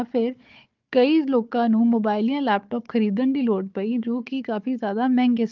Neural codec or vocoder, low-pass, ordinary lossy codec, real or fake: codec, 16 kHz, 4 kbps, FunCodec, trained on Chinese and English, 50 frames a second; 7.2 kHz; Opus, 32 kbps; fake